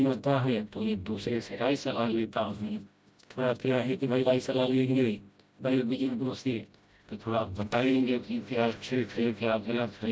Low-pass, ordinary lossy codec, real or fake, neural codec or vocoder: none; none; fake; codec, 16 kHz, 0.5 kbps, FreqCodec, smaller model